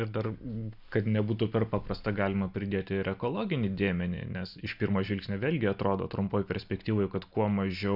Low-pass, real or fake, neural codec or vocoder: 5.4 kHz; real; none